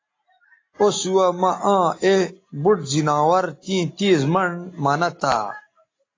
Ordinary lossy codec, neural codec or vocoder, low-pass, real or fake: AAC, 32 kbps; none; 7.2 kHz; real